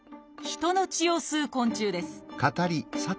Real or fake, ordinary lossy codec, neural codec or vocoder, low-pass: real; none; none; none